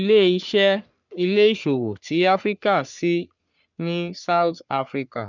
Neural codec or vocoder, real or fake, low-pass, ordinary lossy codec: codec, 44.1 kHz, 3.4 kbps, Pupu-Codec; fake; 7.2 kHz; none